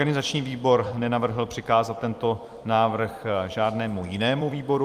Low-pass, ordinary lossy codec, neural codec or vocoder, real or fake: 14.4 kHz; Opus, 32 kbps; vocoder, 44.1 kHz, 128 mel bands every 256 samples, BigVGAN v2; fake